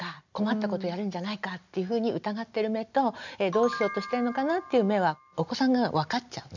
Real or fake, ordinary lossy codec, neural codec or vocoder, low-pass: real; none; none; 7.2 kHz